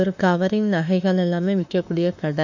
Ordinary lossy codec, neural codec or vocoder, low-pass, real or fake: none; autoencoder, 48 kHz, 32 numbers a frame, DAC-VAE, trained on Japanese speech; 7.2 kHz; fake